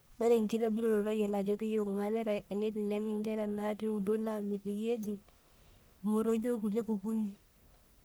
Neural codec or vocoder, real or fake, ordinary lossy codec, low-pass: codec, 44.1 kHz, 1.7 kbps, Pupu-Codec; fake; none; none